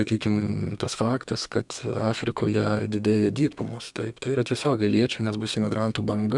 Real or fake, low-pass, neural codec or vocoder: fake; 10.8 kHz; codec, 32 kHz, 1.9 kbps, SNAC